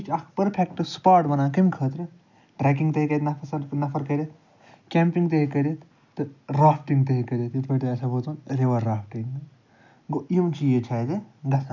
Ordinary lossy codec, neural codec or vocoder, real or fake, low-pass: none; none; real; 7.2 kHz